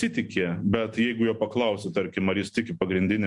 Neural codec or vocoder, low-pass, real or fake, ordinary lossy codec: none; 10.8 kHz; real; MP3, 64 kbps